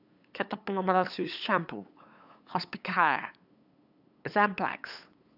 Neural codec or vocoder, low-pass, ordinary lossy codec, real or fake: codec, 16 kHz, 8 kbps, FunCodec, trained on LibriTTS, 25 frames a second; 5.4 kHz; AAC, 48 kbps; fake